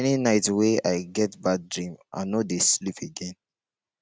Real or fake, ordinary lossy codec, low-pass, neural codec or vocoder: real; none; none; none